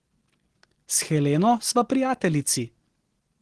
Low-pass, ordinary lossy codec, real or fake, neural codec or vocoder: 10.8 kHz; Opus, 16 kbps; real; none